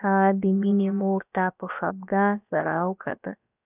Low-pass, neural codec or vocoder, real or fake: 3.6 kHz; codec, 16 kHz, about 1 kbps, DyCAST, with the encoder's durations; fake